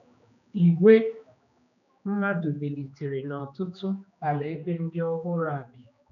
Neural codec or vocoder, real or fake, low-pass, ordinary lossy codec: codec, 16 kHz, 2 kbps, X-Codec, HuBERT features, trained on general audio; fake; 7.2 kHz; none